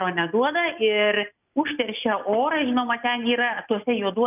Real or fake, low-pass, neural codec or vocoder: real; 3.6 kHz; none